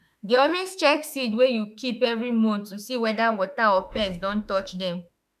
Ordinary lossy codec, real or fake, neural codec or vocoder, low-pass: none; fake; autoencoder, 48 kHz, 32 numbers a frame, DAC-VAE, trained on Japanese speech; 14.4 kHz